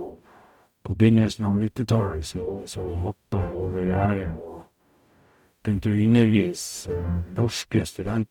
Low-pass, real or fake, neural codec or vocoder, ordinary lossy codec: 19.8 kHz; fake; codec, 44.1 kHz, 0.9 kbps, DAC; none